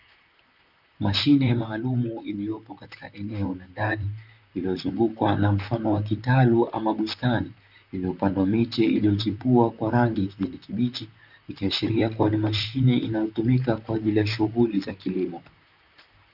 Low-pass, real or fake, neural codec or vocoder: 5.4 kHz; fake; vocoder, 44.1 kHz, 128 mel bands, Pupu-Vocoder